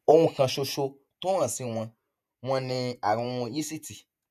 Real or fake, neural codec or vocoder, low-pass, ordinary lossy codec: fake; vocoder, 48 kHz, 128 mel bands, Vocos; 14.4 kHz; none